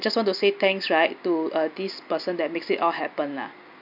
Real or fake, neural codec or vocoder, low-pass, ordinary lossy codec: real; none; 5.4 kHz; none